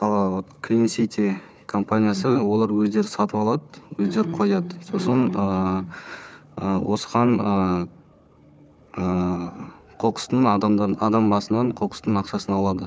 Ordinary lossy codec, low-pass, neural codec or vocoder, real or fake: none; none; codec, 16 kHz, 4 kbps, FunCodec, trained on Chinese and English, 50 frames a second; fake